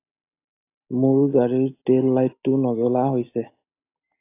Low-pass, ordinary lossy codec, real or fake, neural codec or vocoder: 3.6 kHz; AAC, 24 kbps; real; none